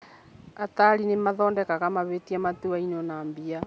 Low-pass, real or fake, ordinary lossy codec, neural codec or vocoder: none; real; none; none